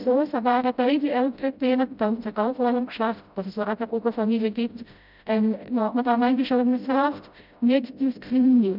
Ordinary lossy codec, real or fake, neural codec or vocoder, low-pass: none; fake; codec, 16 kHz, 0.5 kbps, FreqCodec, smaller model; 5.4 kHz